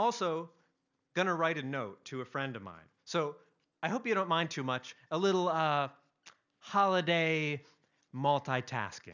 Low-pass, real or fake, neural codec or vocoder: 7.2 kHz; real; none